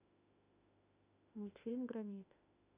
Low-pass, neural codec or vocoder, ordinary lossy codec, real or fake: 3.6 kHz; autoencoder, 48 kHz, 32 numbers a frame, DAC-VAE, trained on Japanese speech; AAC, 24 kbps; fake